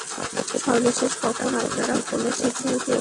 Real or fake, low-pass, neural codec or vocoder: real; 10.8 kHz; none